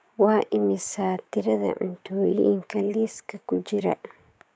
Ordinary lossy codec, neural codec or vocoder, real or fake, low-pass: none; codec, 16 kHz, 6 kbps, DAC; fake; none